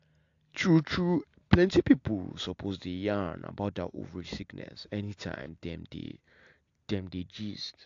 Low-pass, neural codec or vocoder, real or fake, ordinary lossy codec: 7.2 kHz; none; real; AAC, 48 kbps